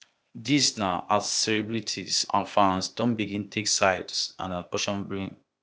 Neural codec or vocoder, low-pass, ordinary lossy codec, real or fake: codec, 16 kHz, 0.8 kbps, ZipCodec; none; none; fake